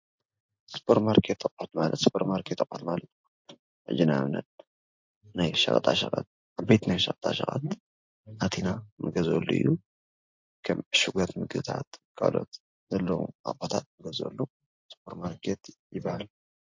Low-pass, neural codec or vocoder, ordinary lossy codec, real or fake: 7.2 kHz; none; MP3, 48 kbps; real